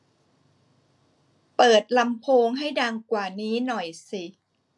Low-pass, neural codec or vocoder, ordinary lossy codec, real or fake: none; none; none; real